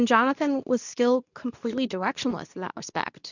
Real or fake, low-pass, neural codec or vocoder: fake; 7.2 kHz; codec, 24 kHz, 0.9 kbps, WavTokenizer, medium speech release version 2